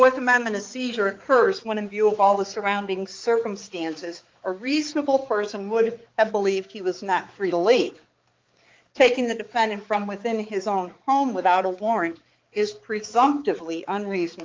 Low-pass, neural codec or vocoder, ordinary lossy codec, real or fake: 7.2 kHz; codec, 16 kHz, 4 kbps, X-Codec, HuBERT features, trained on balanced general audio; Opus, 32 kbps; fake